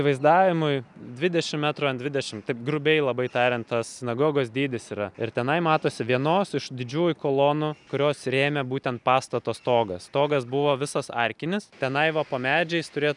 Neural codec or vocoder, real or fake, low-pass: none; real; 10.8 kHz